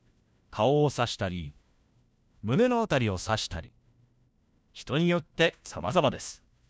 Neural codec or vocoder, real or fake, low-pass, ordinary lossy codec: codec, 16 kHz, 1 kbps, FunCodec, trained on LibriTTS, 50 frames a second; fake; none; none